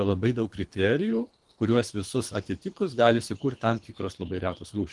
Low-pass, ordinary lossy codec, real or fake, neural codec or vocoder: 10.8 kHz; Opus, 24 kbps; fake; codec, 24 kHz, 3 kbps, HILCodec